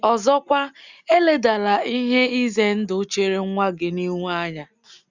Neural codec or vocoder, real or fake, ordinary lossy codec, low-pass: none; real; Opus, 64 kbps; 7.2 kHz